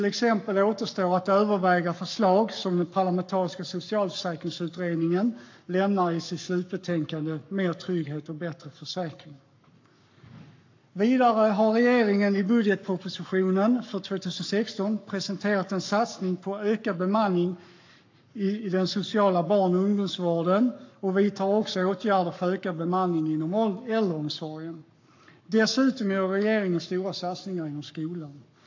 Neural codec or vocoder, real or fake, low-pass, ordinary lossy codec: codec, 44.1 kHz, 7.8 kbps, Pupu-Codec; fake; 7.2 kHz; AAC, 48 kbps